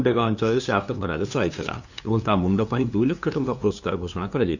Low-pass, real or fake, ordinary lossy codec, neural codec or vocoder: 7.2 kHz; fake; none; codec, 16 kHz, 2 kbps, FunCodec, trained on LibriTTS, 25 frames a second